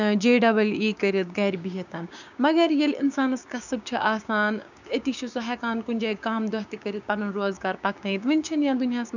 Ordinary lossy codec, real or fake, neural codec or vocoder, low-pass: none; fake; codec, 16 kHz, 6 kbps, DAC; 7.2 kHz